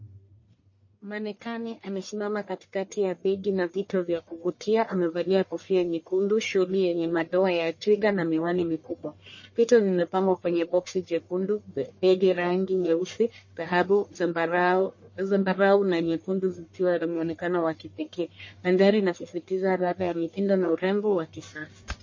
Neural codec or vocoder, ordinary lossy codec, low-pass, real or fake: codec, 44.1 kHz, 1.7 kbps, Pupu-Codec; MP3, 32 kbps; 7.2 kHz; fake